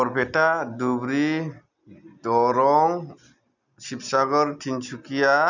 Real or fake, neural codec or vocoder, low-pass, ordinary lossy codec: real; none; 7.2 kHz; none